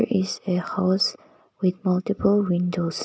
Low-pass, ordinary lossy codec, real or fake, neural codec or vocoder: none; none; real; none